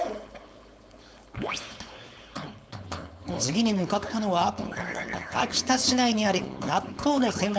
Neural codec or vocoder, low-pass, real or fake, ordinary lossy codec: codec, 16 kHz, 4.8 kbps, FACodec; none; fake; none